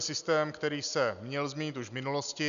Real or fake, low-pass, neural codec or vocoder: real; 7.2 kHz; none